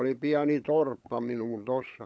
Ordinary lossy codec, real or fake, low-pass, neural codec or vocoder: none; fake; none; codec, 16 kHz, 16 kbps, FunCodec, trained on LibriTTS, 50 frames a second